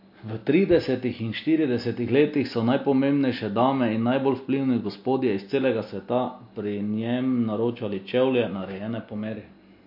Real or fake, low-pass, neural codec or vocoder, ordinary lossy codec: real; 5.4 kHz; none; MP3, 32 kbps